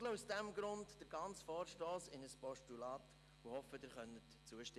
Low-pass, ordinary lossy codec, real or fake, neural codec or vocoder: none; none; real; none